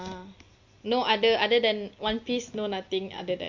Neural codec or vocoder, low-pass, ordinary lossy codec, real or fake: none; 7.2 kHz; MP3, 48 kbps; real